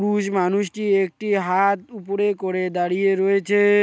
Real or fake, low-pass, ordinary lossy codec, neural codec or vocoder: real; none; none; none